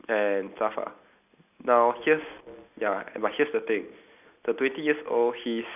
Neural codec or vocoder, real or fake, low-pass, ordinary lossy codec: none; real; 3.6 kHz; none